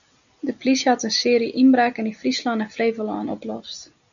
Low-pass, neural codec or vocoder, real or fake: 7.2 kHz; none; real